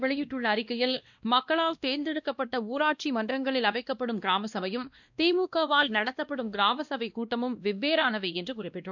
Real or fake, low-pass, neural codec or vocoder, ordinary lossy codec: fake; 7.2 kHz; codec, 16 kHz, 1 kbps, X-Codec, WavLM features, trained on Multilingual LibriSpeech; none